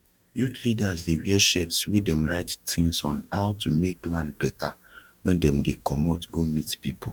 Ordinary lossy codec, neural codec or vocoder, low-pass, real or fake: none; codec, 44.1 kHz, 2.6 kbps, DAC; 19.8 kHz; fake